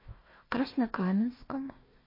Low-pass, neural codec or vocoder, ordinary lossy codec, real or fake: 5.4 kHz; codec, 16 kHz, 1 kbps, FunCodec, trained on Chinese and English, 50 frames a second; MP3, 24 kbps; fake